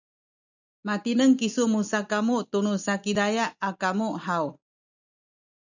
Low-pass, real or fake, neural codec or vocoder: 7.2 kHz; real; none